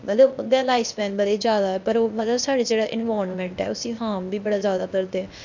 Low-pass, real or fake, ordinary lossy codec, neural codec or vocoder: 7.2 kHz; fake; none; codec, 16 kHz, 0.8 kbps, ZipCodec